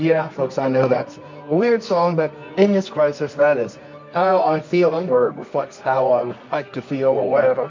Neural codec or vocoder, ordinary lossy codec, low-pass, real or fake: codec, 24 kHz, 0.9 kbps, WavTokenizer, medium music audio release; MP3, 48 kbps; 7.2 kHz; fake